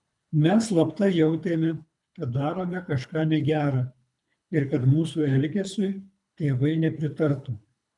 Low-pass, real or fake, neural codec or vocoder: 10.8 kHz; fake; codec, 24 kHz, 3 kbps, HILCodec